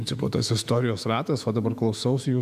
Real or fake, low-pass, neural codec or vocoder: fake; 14.4 kHz; autoencoder, 48 kHz, 128 numbers a frame, DAC-VAE, trained on Japanese speech